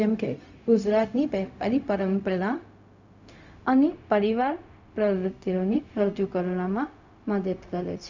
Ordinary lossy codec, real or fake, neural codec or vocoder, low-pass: none; fake; codec, 16 kHz, 0.4 kbps, LongCat-Audio-Codec; 7.2 kHz